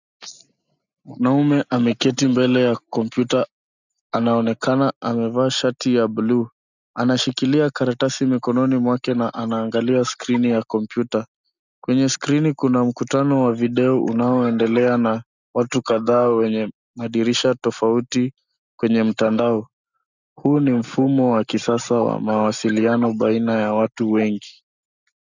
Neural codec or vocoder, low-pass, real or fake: none; 7.2 kHz; real